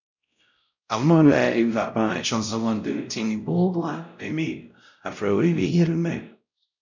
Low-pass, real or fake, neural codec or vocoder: 7.2 kHz; fake; codec, 16 kHz, 0.5 kbps, X-Codec, WavLM features, trained on Multilingual LibriSpeech